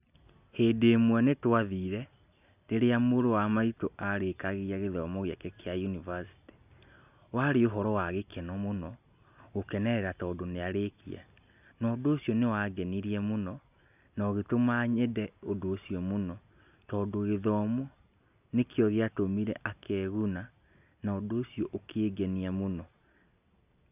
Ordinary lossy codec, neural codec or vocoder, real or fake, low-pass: none; none; real; 3.6 kHz